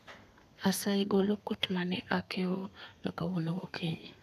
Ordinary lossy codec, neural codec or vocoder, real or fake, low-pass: none; codec, 32 kHz, 1.9 kbps, SNAC; fake; 14.4 kHz